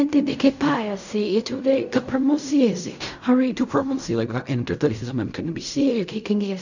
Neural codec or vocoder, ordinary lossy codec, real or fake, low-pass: codec, 16 kHz in and 24 kHz out, 0.4 kbps, LongCat-Audio-Codec, fine tuned four codebook decoder; none; fake; 7.2 kHz